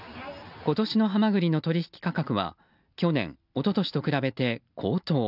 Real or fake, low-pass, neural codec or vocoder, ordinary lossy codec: real; 5.4 kHz; none; none